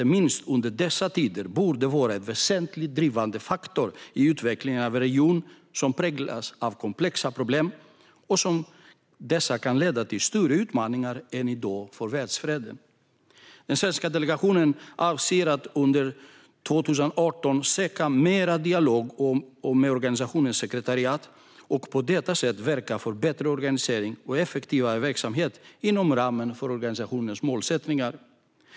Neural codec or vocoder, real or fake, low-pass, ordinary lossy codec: none; real; none; none